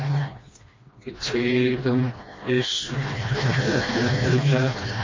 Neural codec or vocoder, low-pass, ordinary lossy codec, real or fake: codec, 16 kHz, 1 kbps, FreqCodec, smaller model; 7.2 kHz; MP3, 32 kbps; fake